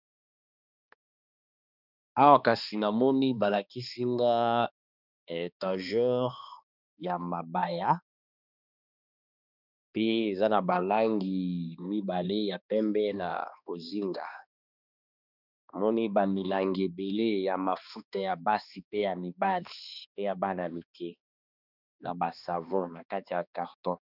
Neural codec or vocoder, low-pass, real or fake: codec, 16 kHz, 2 kbps, X-Codec, HuBERT features, trained on balanced general audio; 5.4 kHz; fake